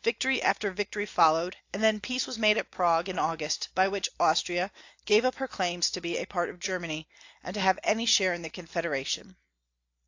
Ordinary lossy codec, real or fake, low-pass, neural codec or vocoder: AAC, 48 kbps; real; 7.2 kHz; none